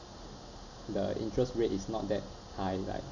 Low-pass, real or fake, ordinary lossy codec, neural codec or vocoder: 7.2 kHz; real; none; none